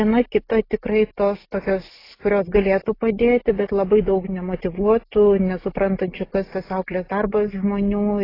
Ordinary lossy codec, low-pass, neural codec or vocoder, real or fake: AAC, 24 kbps; 5.4 kHz; none; real